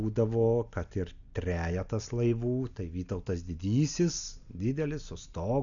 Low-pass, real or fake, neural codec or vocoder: 7.2 kHz; real; none